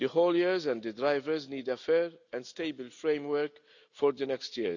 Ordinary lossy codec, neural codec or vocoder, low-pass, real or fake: none; none; 7.2 kHz; real